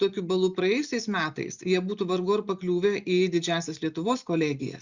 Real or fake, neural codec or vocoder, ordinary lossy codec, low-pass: real; none; Opus, 64 kbps; 7.2 kHz